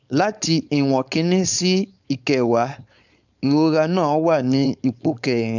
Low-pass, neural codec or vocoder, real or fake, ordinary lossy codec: 7.2 kHz; codec, 16 kHz, 4.8 kbps, FACodec; fake; none